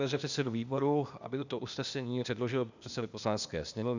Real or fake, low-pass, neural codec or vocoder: fake; 7.2 kHz; codec, 16 kHz, 0.8 kbps, ZipCodec